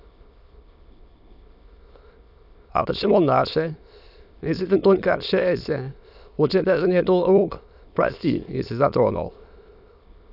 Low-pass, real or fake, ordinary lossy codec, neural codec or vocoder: 5.4 kHz; fake; none; autoencoder, 22.05 kHz, a latent of 192 numbers a frame, VITS, trained on many speakers